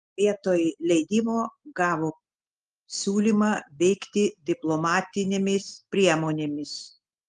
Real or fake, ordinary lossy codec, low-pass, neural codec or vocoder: real; Opus, 24 kbps; 10.8 kHz; none